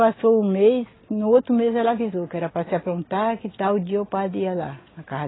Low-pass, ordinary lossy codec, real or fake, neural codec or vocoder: 7.2 kHz; AAC, 16 kbps; real; none